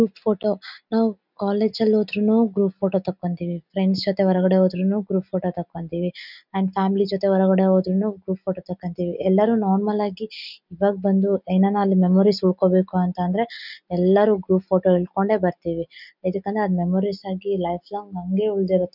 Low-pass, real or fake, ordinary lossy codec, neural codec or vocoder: 5.4 kHz; real; none; none